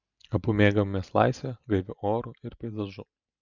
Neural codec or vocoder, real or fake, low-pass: none; real; 7.2 kHz